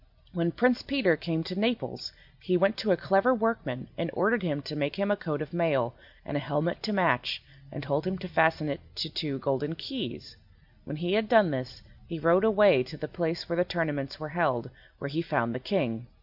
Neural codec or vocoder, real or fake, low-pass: none; real; 5.4 kHz